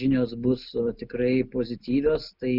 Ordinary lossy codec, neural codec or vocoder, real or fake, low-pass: Opus, 64 kbps; none; real; 5.4 kHz